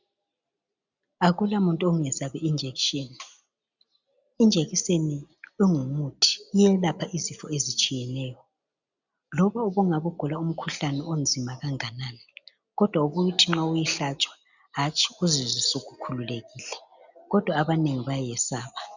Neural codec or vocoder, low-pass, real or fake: none; 7.2 kHz; real